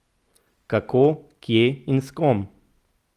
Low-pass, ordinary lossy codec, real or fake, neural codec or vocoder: 14.4 kHz; Opus, 24 kbps; real; none